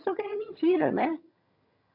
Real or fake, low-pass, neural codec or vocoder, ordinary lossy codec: fake; 5.4 kHz; vocoder, 22.05 kHz, 80 mel bands, HiFi-GAN; none